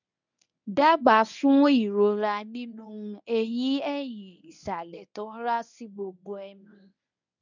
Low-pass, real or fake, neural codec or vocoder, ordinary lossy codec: 7.2 kHz; fake; codec, 24 kHz, 0.9 kbps, WavTokenizer, medium speech release version 1; none